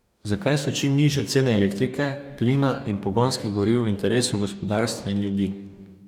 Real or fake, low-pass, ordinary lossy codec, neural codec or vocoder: fake; 19.8 kHz; none; codec, 44.1 kHz, 2.6 kbps, DAC